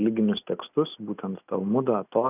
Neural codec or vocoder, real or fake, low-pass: none; real; 3.6 kHz